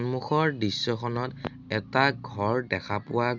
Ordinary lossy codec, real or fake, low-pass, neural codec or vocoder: none; real; 7.2 kHz; none